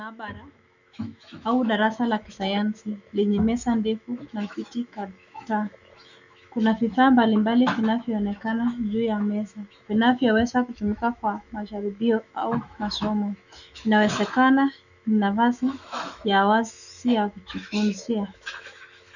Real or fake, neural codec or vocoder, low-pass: fake; autoencoder, 48 kHz, 128 numbers a frame, DAC-VAE, trained on Japanese speech; 7.2 kHz